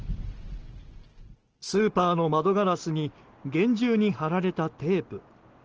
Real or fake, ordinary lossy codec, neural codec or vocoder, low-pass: real; Opus, 16 kbps; none; 7.2 kHz